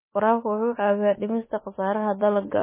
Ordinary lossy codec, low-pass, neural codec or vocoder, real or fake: MP3, 16 kbps; 3.6 kHz; autoencoder, 48 kHz, 32 numbers a frame, DAC-VAE, trained on Japanese speech; fake